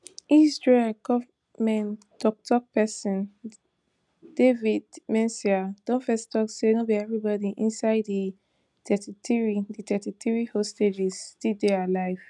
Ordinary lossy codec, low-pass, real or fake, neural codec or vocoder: none; 10.8 kHz; real; none